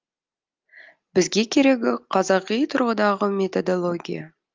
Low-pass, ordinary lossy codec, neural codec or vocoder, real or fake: 7.2 kHz; Opus, 24 kbps; none; real